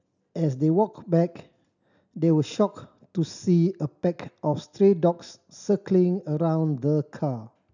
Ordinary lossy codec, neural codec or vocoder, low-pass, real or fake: none; none; 7.2 kHz; real